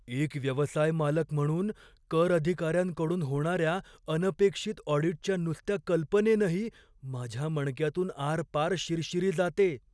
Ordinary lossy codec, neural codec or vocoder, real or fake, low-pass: none; none; real; none